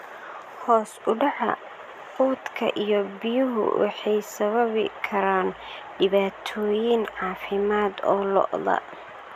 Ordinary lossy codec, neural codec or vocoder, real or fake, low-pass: none; none; real; 14.4 kHz